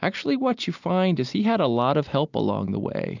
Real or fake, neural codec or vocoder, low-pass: real; none; 7.2 kHz